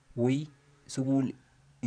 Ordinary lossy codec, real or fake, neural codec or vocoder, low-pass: none; fake; vocoder, 44.1 kHz, 128 mel bands every 512 samples, BigVGAN v2; 9.9 kHz